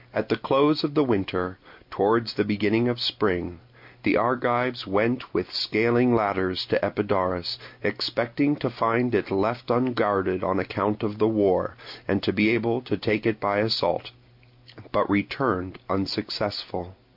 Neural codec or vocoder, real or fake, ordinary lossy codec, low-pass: none; real; MP3, 48 kbps; 5.4 kHz